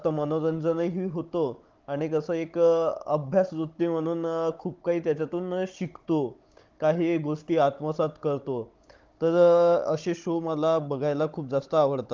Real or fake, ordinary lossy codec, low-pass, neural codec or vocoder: fake; Opus, 32 kbps; 7.2 kHz; codec, 16 kHz, 16 kbps, FunCodec, trained on Chinese and English, 50 frames a second